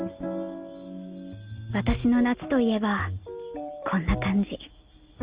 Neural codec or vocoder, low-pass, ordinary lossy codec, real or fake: none; 3.6 kHz; Opus, 24 kbps; real